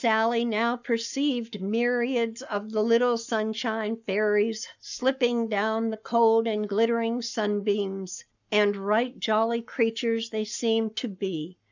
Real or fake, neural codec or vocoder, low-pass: fake; codec, 44.1 kHz, 7.8 kbps, Pupu-Codec; 7.2 kHz